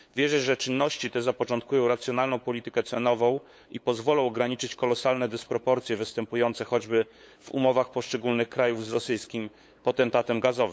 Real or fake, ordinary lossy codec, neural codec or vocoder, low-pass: fake; none; codec, 16 kHz, 8 kbps, FunCodec, trained on LibriTTS, 25 frames a second; none